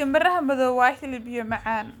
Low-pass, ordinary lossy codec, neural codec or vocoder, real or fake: 19.8 kHz; none; none; real